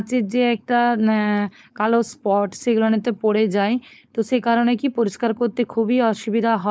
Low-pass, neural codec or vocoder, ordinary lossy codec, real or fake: none; codec, 16 kHz, 4.8 kbps, FACodec; none; fake